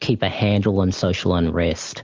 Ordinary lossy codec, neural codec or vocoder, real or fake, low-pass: Opus, 32 kbps; none; real; 7.2 kHz